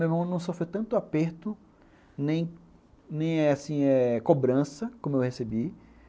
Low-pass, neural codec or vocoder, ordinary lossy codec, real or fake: none; none; none; real